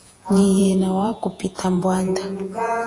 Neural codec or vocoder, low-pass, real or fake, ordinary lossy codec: vocoder, 48 kHz, 128 mel bands, Vocos; 10.8 kHz; fake; AAC, 48 kbps